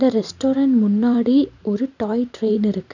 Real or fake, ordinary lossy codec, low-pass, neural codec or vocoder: fake; none; 7.2 kHz; vocoder, 44.1 kHz, 128 mel bands every 512 samples, BigVGAN v2